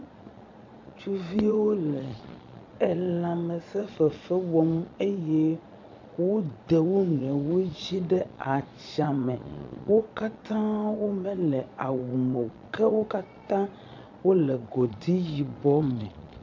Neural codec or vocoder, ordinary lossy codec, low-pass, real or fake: vocoder, 22.05 kHz, 80 mel bands, Vocos; MP3, 64 kbps; 7.2 kHz; fake